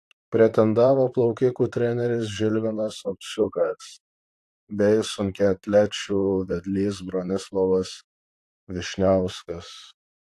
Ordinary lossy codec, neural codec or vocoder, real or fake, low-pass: AAC, 64 kbps; vocoder, 44.1 kHz, 128 mel bands, Pupu-Vocoder; fake; 14.4 kHz